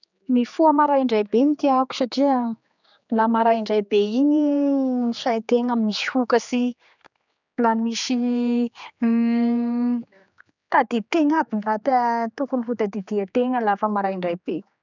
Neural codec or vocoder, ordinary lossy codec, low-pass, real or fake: codec, 16 kHz, 4 kbps, X-Codec, HuBERT features, trained on general audio; none; 7.2 kHz; fake